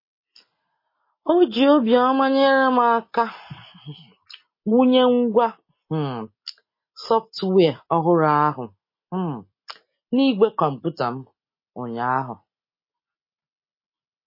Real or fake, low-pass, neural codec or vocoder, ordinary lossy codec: real; 5.4 kHz; none; MP3, 24 kbps